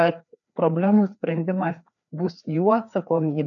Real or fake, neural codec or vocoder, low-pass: fake; codec, 16 kHz, 2 kbps, FreqCodec, larger model; 7.2 kHz